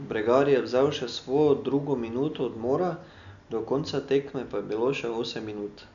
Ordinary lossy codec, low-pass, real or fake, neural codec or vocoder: none; 7.2 kHz; real; none